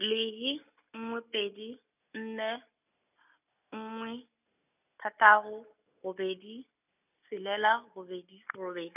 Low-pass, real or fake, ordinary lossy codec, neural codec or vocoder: 3.6 kHz; real; none; none